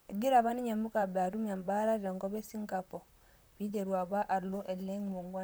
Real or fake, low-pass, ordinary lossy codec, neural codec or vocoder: fake; none; none; vocoder, 44.1 kHz, 128 mel bands, Pupu-Vocoder